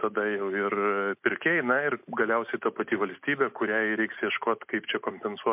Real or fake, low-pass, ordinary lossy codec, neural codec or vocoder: real; 3.6 kHz; MP3, 32 kbps; none